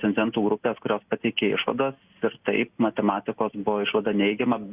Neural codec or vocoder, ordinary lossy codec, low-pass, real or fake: none; Opus, 64 kbps; 3.6 kHz; real